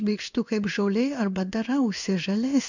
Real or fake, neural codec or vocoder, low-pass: fake; codec, 16 kHz in and 24 kHz out, 1 kbps, XY-Tokenizer; 7.2 kHz